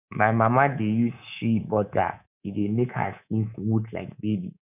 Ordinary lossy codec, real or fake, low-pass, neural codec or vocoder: none; fake; 3.6 kHz; codec, 24 kHz, 3.1 kbps, DualCodec